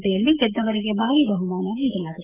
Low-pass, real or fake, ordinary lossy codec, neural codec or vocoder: 3.6 kHz; fake; none; codec, 44.1 kHz, 7.8 kbps, DAC